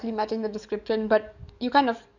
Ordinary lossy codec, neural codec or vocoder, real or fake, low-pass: none; codec, 44.1 kHz, 7.8 kbps, DAC; fake; 7.2 kHz